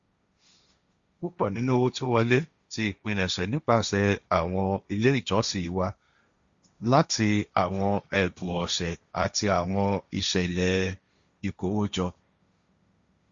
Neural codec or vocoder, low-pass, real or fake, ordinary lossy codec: codec, 16 kHz, 1.1 kbps, Voila-Tokenizer; 7.2 kHz; fake; Opus, 64 kbps